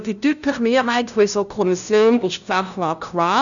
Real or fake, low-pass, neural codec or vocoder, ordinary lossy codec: fake; 7.2 kHz; codec, 16 kHz, 0.5 kbps, FunCodec, trained on LibriTTS, 25 frames a second; none